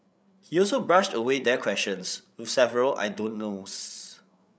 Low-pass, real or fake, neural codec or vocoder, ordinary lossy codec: none; fake; codec, 16 kHz, 8 kbps, FreqCodec, larger model; none